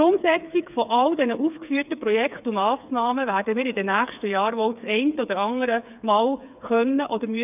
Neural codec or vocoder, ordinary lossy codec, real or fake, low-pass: codec, 16 kHz, 4 kbps, FreqCodec, smaller model; none; fake; 3.6 kHz